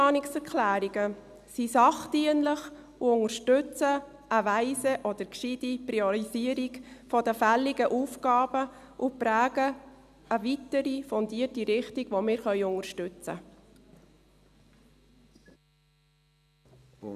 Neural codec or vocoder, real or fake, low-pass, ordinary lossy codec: none; real; 14.4 kHz; none